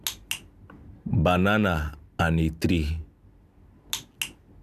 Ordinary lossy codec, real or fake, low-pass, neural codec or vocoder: none; real; 14.4 kHz; none